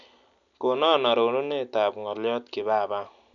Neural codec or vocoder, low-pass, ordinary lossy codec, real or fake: none; 7.2 kHz; none; real